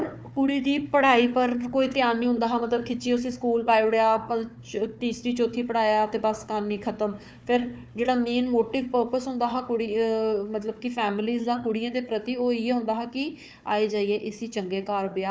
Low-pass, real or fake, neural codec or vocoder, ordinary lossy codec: none; fake; codec, 16 kHz, 4 kbps, FunCodec, trained on Chinese and English, 50 frames a second; none